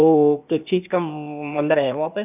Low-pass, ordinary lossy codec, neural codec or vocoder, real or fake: 3.6 kHz; none; codec, 16 kHz, 0.8 kbps, ZipCodec; fake